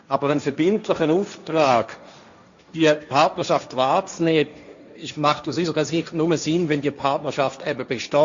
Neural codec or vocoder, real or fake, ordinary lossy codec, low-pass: codec, 16 kHz, 1.1 kbps, Voila-Tokenizer; fake; Opus, 64 kbps; 7.2 kHz